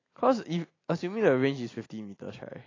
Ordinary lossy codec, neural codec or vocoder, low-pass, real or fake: AAC, 32 kbps; none; 7.2 kHz; real